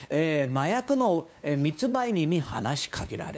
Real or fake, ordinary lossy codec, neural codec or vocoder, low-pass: fake; none; codec, 16 kHz, 2 kbps, FunCodec, trained on LibriTTS, 25 frames a second; none